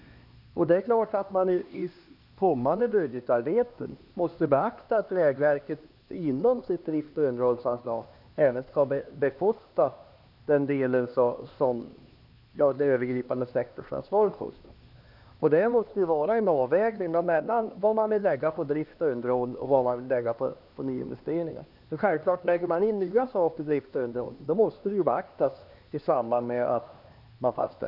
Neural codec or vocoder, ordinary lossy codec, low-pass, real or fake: codec, 16 kHz, 2 kbps, X-Codec, HuBERT features, trained on LibriSpeech; none; 5.4 kHz; fake